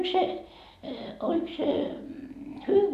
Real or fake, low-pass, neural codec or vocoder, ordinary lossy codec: real; 14.4 kHz; none; none